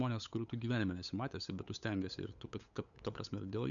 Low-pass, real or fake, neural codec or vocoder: 7.2 kHz; fake; codec, 16 kHz, 8 kbps, FunCodec, trained on LibriTTS, 25 frames a second